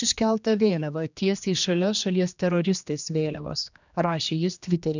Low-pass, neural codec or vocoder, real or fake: 7.2 kHz; codec, 16 kHz, 2 kbps, X-Codec, HuBERT features, trained on general audio; fake